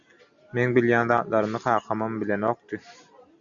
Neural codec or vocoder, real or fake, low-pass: none; real; 7.2 kHz